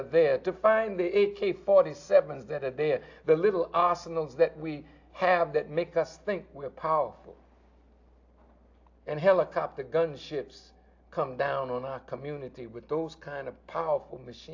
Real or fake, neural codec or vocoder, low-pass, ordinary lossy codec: real; none; 7.2 kHz; AAC, 48 kbps